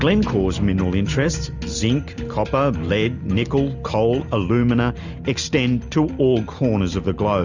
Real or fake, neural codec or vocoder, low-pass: real; none; 7.2 kHz